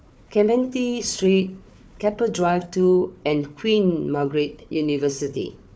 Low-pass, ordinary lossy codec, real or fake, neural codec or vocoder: none; none; fake; codec, 16 kHz, 4 kbps, FunCodec, trained on Chinese and English, 50 frames a second